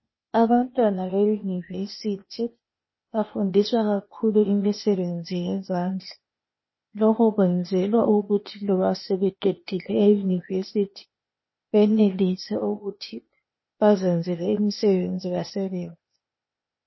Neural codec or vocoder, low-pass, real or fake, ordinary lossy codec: codec, 16 kHz, 0.8 kbps, ZipCodec; 7.2 kHz; fake; MP3, 24 kbps